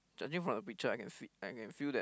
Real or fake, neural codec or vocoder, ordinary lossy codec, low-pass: real; none; none; none